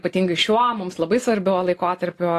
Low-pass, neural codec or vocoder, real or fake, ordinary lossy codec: 14.4 kHz; none; real; AAC, 48 kbps